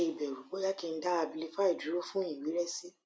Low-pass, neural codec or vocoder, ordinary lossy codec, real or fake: none; none; none; real